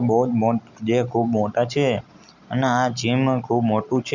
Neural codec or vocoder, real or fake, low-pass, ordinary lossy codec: none; real; 7.2 kHz; none